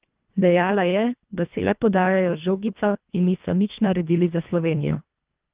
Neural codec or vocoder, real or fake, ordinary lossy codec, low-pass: codec, 24 kHz, 1.5 kbps, HILCodec; fake; Opus, 24 kbps; 3.6 kHz